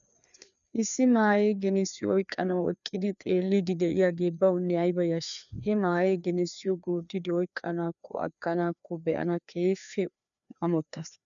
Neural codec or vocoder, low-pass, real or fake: codec, 16 kHz, 2 kbps, FreqCodec, larger model; 7.2 kHz; fake